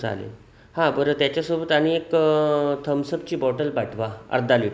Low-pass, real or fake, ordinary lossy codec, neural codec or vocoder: none; real; none; none